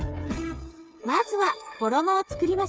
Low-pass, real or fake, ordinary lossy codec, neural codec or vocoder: none; fake; none; codec, 16 kHz, 8 kbps, FreqCodec, smaller model